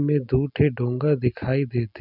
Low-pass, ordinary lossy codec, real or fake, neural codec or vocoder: 5.4 kHz; none; real; none